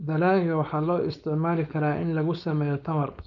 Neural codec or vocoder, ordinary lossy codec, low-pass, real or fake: codec, 16 kHz, 4.8 kbps, FACodec; none; 7.2 kHz; fake